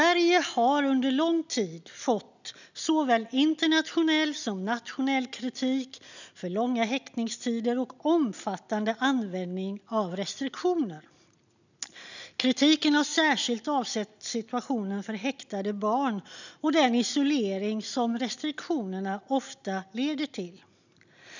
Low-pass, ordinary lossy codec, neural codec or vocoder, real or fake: 7.2 kHz; none; none; real